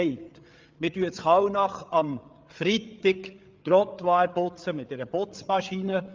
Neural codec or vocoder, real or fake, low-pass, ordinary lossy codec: codec, 16 kHz, 8 kbps, FreqCodec, larger model; fake; 7.2 kHz; Opus, 24 kbps